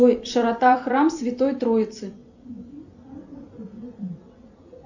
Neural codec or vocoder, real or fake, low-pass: vocoder, 44.1 kHz, 128 mel bands every 256 samples, BigVGAN v2; fake; 7.2 kHz